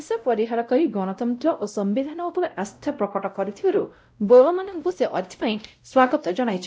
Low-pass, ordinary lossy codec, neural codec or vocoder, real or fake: none; none; codec, 16 kHz, 0.5 kbps, X-Codec, WavLM features, trained on Multilingual LibriSpeech; fake